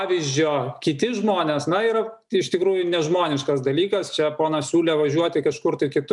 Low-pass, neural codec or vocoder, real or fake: 10.8 kHz; none; real